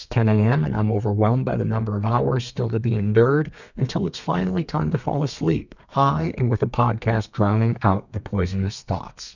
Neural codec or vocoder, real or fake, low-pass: codec, 32 kHz, 1.9 kbps, SNAC; fake; 7.2 kHz